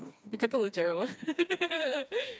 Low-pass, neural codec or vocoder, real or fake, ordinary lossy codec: none; codec, 16 kHz, 2 kbps, FreqCodec, smaller model; fake; none